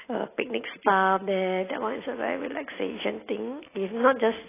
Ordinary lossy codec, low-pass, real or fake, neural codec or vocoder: AAC, 16 kbps; 3.6 kHz; real; none